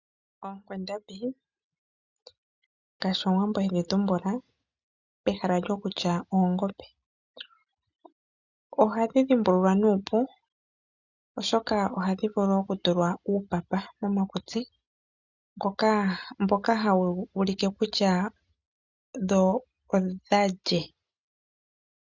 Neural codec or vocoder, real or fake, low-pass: none; real; 7.2 kHz